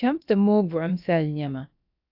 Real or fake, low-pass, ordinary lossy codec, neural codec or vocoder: fake; 5.4 kHz; AAC, 48 kbps; codec, 16 kHz, about 1 kbps, DyCAST, with the encoder's durations